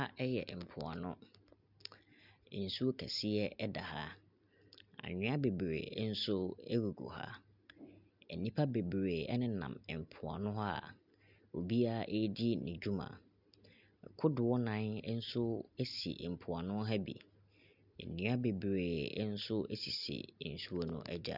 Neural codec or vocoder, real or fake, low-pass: none; real; 5.4 kHz